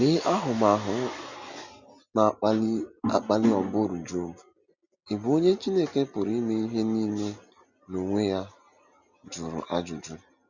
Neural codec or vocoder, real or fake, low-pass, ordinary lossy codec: none; real; 7.2 kHz; Opus, 64 kbps